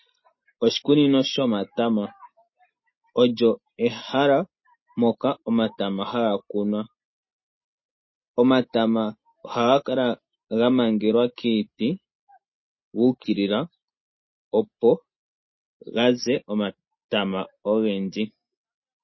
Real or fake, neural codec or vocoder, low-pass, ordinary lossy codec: real; none; 7.2 kHz; MP3, 24 kbps